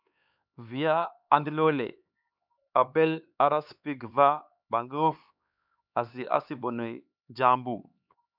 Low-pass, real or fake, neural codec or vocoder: 5.4 kHz; fake; codec, 16 kHz, 4 kbps, X-Codec, HuBERT features, trained on LibriSpeech